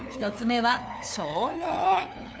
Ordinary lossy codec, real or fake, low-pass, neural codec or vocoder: none; fake; none; codec, 16 kHz, 2 kbps, FunCodec, trained on LibriTTS, 25 frames a second